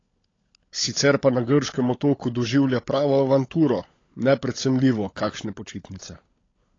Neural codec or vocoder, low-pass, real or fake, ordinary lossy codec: codec, 16 kHz, 16 kbps, FunCodec, trained on LibriTTS, 50 frames a second; 7.2 kHz; fake; AAC, 32 kbps